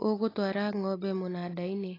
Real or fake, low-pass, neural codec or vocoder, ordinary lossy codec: real; 5.4 kHz; none; AAC, 24 kbps